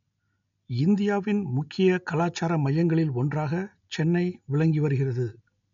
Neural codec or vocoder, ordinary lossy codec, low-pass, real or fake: none; MP3, 64 kbps; 7.2 kHz; real